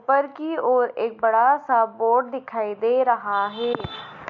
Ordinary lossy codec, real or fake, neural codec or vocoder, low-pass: MP3, 48 kbps; real; none; 7.2 kHz